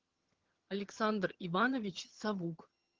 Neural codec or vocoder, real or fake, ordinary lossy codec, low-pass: vocoder, 22.05 kHz, 80 mel bands, HiFi-GAN; fake; Opus, 16 kbps; 7.2 kHz